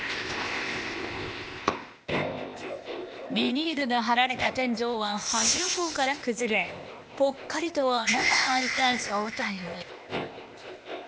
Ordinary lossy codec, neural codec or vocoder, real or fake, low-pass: none; codec, 16 kHz, 0.8 kbps, ZipCodec; fake; none